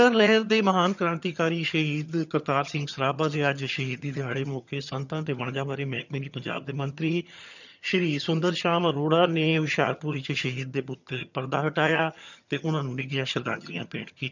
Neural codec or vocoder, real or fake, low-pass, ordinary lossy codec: vocoder, 22.05 kHz, 80 mel bands, HiFi-GAN; fake; 7.2 kHz; none